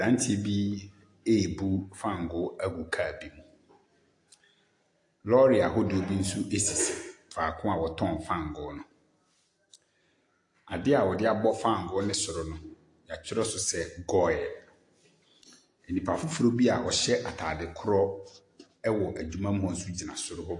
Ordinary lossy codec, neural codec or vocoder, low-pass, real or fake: AAC, 48 kbps; none; 10.8 kHz; real